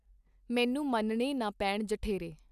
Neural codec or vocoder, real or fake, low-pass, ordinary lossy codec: none; real; 14.4 kHz; none